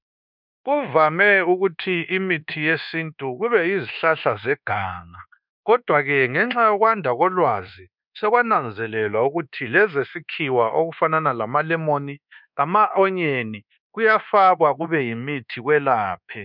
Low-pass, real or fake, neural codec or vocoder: 5.4 kHz; fake; codec, 24 kHz, 1.2 kbps, DualCodec